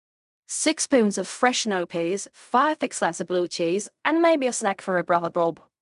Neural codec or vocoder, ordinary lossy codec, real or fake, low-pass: codec, 16 kHz in and 24 kHz out, 0.4 kbps, LongCat-Audio-Codec, fine tuned four codebook decoder; MP3, 96 kbps; fake; 10.8 kHz